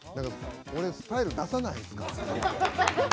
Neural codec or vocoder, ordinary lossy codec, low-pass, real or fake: none; none; none; real